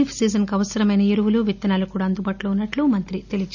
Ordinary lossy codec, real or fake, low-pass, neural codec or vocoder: none; real; 7.2 kHz; none